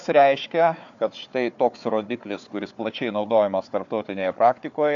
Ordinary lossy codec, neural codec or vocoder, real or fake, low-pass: AAC, 64 kbps; codec, 16 kHz, 4 kbps, FunCodec, trained on Chinese and English, 50 frames a second; fake; 7.2 kHz